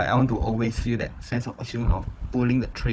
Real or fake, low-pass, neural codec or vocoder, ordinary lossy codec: fake; none; codec, 16 kHz, 4 kbps, FunCodec, trained on Chinese and English, 50 frames a second; none